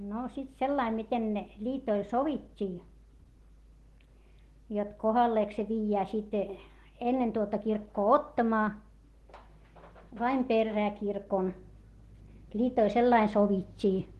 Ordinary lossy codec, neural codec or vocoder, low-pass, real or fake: Opus, 16 kbps; none; 14.4 kHz; real